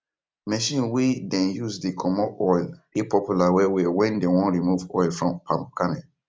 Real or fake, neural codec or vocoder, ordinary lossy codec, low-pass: real; none; none; none